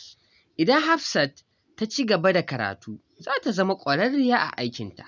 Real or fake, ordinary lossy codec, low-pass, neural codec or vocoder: real; none; 7.2 kHz; none